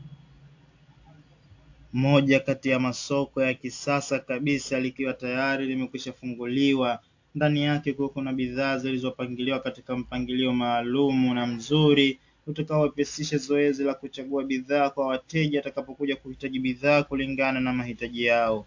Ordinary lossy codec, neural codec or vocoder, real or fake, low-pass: AAC, 48 kbps; none; real; 7.2 kHz